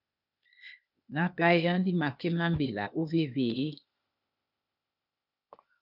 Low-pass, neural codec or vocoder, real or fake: 5.4 kHz; codec, 16 kHz, 0.8 kbps, ZipCodec; fake